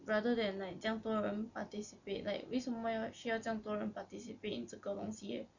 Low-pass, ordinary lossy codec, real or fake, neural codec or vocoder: 7.2 kHz; none; real; none